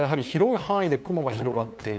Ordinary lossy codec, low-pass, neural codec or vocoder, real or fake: none; none; codec, 16 kHz, 2 kbps, FunCodec, trained on LibriTTS, 25 frames a second; fake